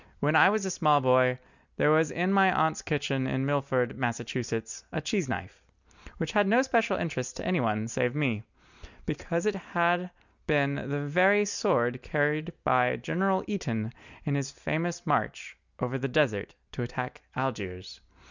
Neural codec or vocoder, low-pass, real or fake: none; 7.2 kHz; real